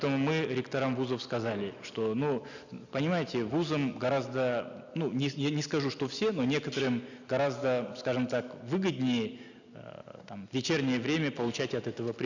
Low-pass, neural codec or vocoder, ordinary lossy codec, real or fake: 7.2 kHz; none; none; real